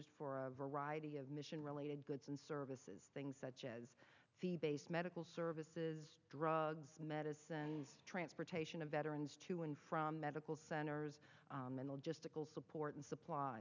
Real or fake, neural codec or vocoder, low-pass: real; none; 7.2 kHz